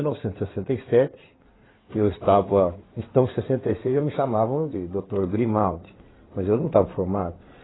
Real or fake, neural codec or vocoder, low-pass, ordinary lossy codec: fake; codec, 16 kHz in and 24 kHz out, 2.2 kbps, FireRedTTS-2 codec; 7.2 kHz; AAC, 16 kbps